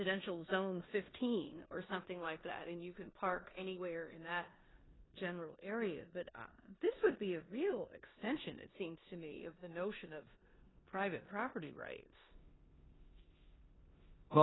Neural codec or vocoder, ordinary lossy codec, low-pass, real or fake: codec, 16 kHz in and 24 kHz out, 0.9 kbps, LongCat-Audio-Codec, four codebook decoder; AAC, 16 kbps; 7.2 kHz; fake